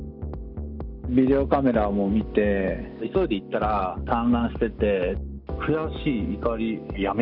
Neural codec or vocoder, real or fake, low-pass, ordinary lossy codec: none; real; 5.4 kHz; none